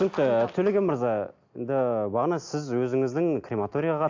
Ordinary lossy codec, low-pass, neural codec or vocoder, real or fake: AAC, 48 kbps; 7.2 kHz; none; real